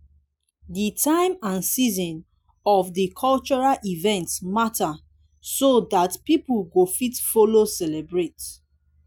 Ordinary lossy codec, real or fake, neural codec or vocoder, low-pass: none; real; none; none